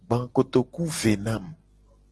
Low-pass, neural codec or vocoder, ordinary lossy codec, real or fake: 10.8 kHz; none; Opus, 16 kbps; real